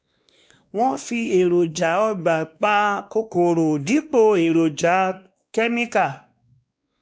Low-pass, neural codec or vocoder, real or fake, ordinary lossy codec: none; codec, 16 kHz, 2 kbps, X-Codec, WavLM features, trained on Multilingual LibriSpeech; fake; none